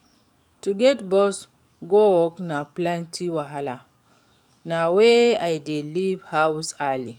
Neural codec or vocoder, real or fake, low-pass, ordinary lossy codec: codec, 44.1 kHz, 7.8 kbps, DAC; fake; 19.8 kHz; none